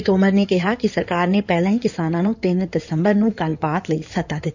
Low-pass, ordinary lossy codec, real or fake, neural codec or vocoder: 7.2 kHz; none; fake; codec, 16 kHz in and 24 kHz out, 2.2 kbps, FireRedTTS-2 codec